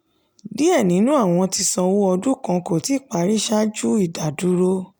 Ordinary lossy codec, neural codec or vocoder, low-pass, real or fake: none; none; none; real